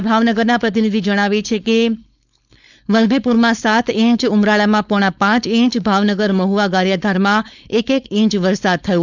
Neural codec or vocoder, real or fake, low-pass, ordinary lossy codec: codec, 16 kHz, 4.8 kbps, FACodec; fake; 7.2 kHz; none